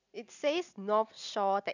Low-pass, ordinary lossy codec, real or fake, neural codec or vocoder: 7.2 kHz; none; real; none